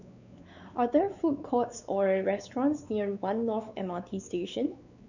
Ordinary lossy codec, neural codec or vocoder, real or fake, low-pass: none; codec, 16 kHz, 4 kbps, X-Codec, WavLM features, trained on Multilingual LibriSpeech; fake; 7.2 kHz